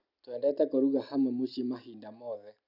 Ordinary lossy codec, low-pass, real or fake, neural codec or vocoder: none; 5.4 kHz; real; none